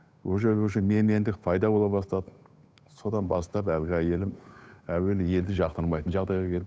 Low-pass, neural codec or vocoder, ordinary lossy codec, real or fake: none; codec, 16 kHz, 8 kbps, FunCodec, trained on Chinese and English, 25 frames a second; none; fake